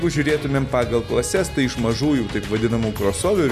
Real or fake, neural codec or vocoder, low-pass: real; none; 14.4 kHz